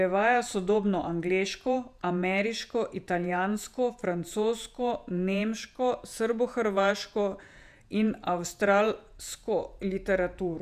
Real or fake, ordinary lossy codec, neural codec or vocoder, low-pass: fake; none; vocoder, 48 kHz, 128 mel bands, Vocos; 14.4 kHz